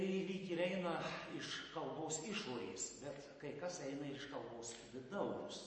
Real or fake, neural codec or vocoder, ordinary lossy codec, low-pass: real; none; MP3, 32 kbps; 9.9 kHz